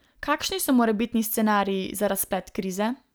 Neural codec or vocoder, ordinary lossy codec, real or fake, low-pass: none; none; real; none